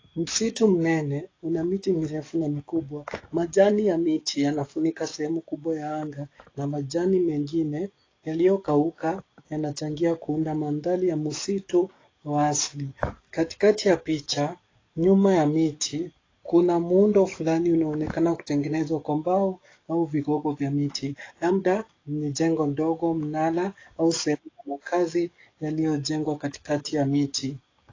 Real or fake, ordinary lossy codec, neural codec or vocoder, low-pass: fake; AAC, 32 kbps; codec, 44.1 kHz, 7.8 kbps, DAC; 7.2 kHz